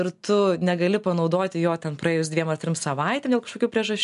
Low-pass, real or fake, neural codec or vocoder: 10.8 kHz; real; none